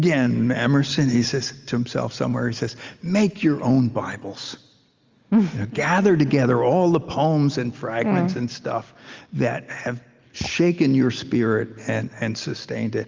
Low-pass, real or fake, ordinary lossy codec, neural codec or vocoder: 7.2 kHz; real; Opus, 32 kbps; none